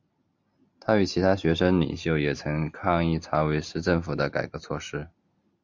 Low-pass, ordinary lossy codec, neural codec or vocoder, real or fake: 7.2 kHz; MP3, 64 kbps; none; real